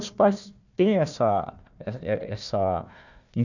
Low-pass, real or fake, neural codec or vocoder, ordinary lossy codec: 7.2 kHz; fake; codec, 16 kHz, 1 kbps, FunCodec, trained on Chinese and English, 50 frames a second; none